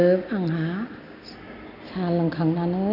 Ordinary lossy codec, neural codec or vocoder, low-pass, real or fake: none; none; 5.4 kHz; real